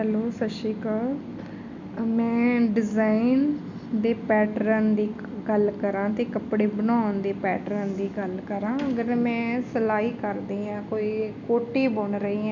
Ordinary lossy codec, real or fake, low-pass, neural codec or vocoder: none; real; 7.2 kHz; none